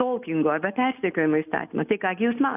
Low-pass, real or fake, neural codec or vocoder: 3.6 kHz; real; none